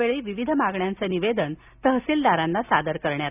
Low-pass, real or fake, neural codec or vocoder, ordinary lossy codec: 3.6 kHz; real; none; none